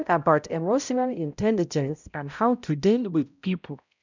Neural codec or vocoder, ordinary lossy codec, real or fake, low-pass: codec, 16 kHz, 0.5 kbps, X-Codec, HuBERT features, trained on balanced general audio; none; fake; 7.2 kHz